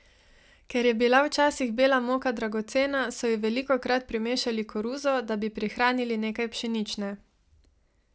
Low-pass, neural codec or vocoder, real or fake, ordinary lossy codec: none; none; real; none